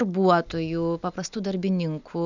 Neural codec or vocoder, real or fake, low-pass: none; real; 7.2 kHz